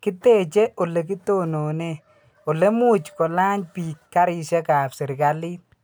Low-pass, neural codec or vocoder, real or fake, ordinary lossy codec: none; none; real; none